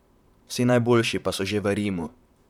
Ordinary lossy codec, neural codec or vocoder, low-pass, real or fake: none; vocoder, 44.1 kHz, 128 mel bands, Pupu-Vocoder; 19.8 kHz; fake